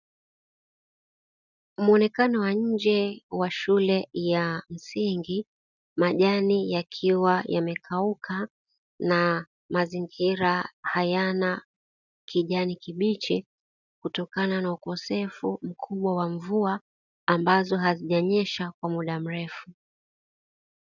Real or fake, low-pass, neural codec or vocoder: real; 7.2 kHz; none